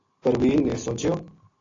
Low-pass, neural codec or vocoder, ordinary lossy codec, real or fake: 7.2 kHz; none; AAC, 32 kbps; real